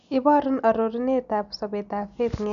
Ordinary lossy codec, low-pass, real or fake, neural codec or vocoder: none; 7.2 kHz; real; none